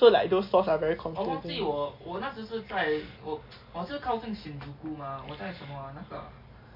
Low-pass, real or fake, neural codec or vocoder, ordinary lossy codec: 5.4 kHz; real; none; MP3, 32 kbps